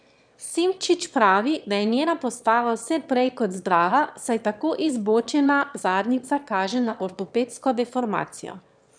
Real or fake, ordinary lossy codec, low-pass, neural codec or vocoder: fake; none; 9.9 kHz; autoencoder, 22.05 kHz, a latent of 192 numbers a frame, VITS, trained on one speaker